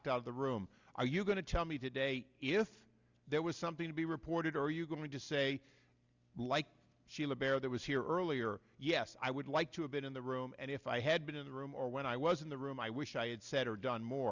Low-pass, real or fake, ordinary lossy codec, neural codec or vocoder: 7.2 kHz; real; Opus, 64 kbps; none